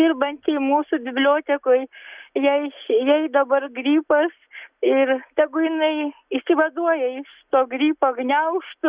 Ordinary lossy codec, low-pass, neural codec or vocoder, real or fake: Opus, 64 kbps; 3.6 kHz; none; real